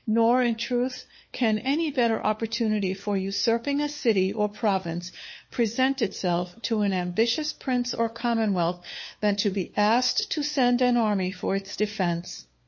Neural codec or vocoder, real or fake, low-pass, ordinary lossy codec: codec, 16 kHz, 4 kbps, FunCodec, trained on LibriTTS, 50 frames a second; fake; 7.2 kHz; MP3, 32 kbps